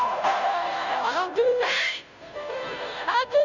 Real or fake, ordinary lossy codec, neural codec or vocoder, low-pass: fake; none; codec, 16 kHz, 0.5 kbps, FunCodec, trained on Chinese and English, 25 frames a second; 7.2 kHz